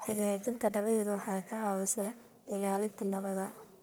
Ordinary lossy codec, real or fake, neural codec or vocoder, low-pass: none; fake; codec, 44.1 kHz, 1.7 kbps, Pupu-Codec; none